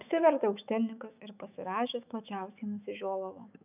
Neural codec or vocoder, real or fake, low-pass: autoencoder, 48 kHz, 128 numbers a frame, DAC-VAE, trained on Japanese speech; fake; 3.6 kHz